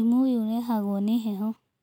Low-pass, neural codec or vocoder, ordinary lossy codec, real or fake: 19.8 kHz; none; none; real